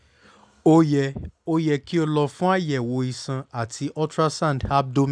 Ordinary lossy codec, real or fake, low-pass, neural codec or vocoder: none; real; 9.9 kHz; none